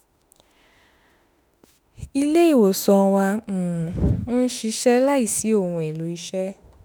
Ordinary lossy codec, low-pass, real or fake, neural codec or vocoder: none; none; fake; autoencoder, 48 kHz, 32 numbers a frame, DAC-VAE, trained on Japanese speech